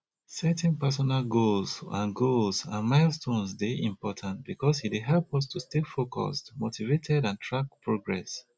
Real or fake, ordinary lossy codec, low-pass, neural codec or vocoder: real; none; none; none